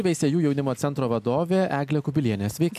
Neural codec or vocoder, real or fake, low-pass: none; real; 14.4 kHz